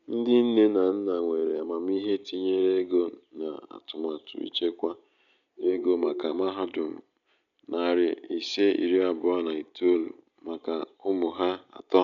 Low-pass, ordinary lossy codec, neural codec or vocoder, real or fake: 7.2 kHz; none; none; real